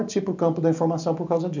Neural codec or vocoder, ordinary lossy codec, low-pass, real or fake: none; none; 7.2 kHz; real